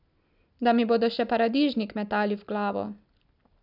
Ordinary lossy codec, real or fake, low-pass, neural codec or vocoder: none; real; 5.4 kHz; none